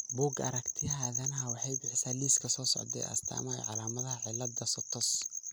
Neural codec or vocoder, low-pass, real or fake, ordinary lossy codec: none; none; real; none